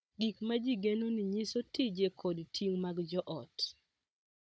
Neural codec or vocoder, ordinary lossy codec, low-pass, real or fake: codec, 16 kHz, 16 kbps, FunCodec, trained on Chinese and English, 50 frames a second; none; none; fake